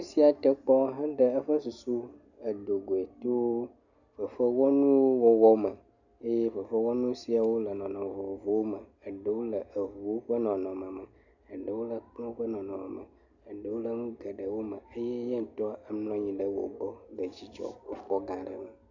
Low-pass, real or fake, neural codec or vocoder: 7.2 kHz; real; none